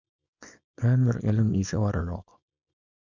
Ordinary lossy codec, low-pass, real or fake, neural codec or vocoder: Opus, 64 kbps; 7.2 kHz; fake; codec, 24 kHz, 0.9 kbps, WavTokenizer, small release